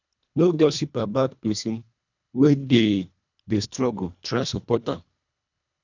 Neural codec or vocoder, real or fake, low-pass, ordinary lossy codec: codec, 24 kHz, 1.5 kbps, HILCodec; fake; 7.2 kHz; none